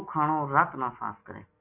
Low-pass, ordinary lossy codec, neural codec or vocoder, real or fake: 3.6 kHz; AAC, 32 kbps; none; real